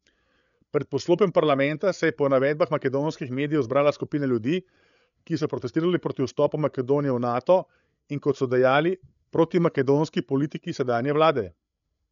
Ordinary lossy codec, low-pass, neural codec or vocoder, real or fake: MP3, 96 kbps; 7.2 kHz; codec, 16 kHz, 8 kbps, FreqCodec, larger model; fake